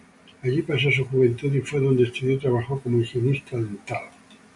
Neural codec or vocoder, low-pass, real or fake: none; 10.8 kHz; real